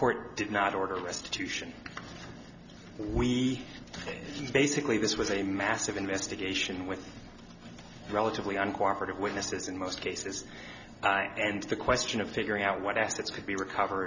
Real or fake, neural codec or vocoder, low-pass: real; none; 7.2 kHz